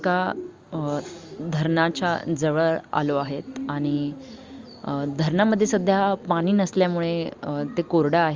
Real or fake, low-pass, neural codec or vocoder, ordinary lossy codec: real; 7.2 kHz; none; Opus, 32 kbps